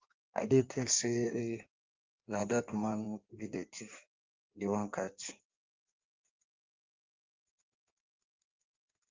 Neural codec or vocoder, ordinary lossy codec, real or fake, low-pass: codec, 16 kHz in and 24 kHz out, 1.1 kbps, FireRedTTS-2 codec; Opus, 24 kbps; fake; 7.2 kHz